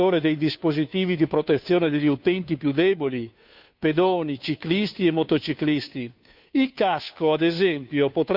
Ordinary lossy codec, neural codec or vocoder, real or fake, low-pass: none; codec, 16 kHz, 2 kbps, FunCodec, trained on Chinese and English, 25 frames a second; fake; 5.4 kHz